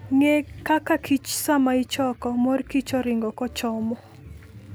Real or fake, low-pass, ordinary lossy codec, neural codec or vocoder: real; none; none; none